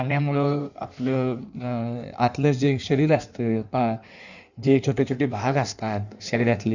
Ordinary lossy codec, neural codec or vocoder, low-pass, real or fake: none; codec, 16 kHz in and 24 kHz out, 1.1 kbps, FireRedTTS-2 codec; 7.2 kHz; fake